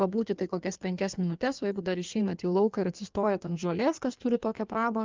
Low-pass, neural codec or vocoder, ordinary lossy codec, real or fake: 7.2 kHz; codec, 16 kHz in and 24 kHz out, 1.1 kbps, FireRedTTS-2 codec; Opus, 24 kbps; fake